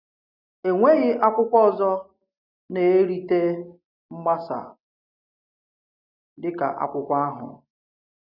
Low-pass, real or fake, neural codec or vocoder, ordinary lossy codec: 5.4 kHz; real; none; none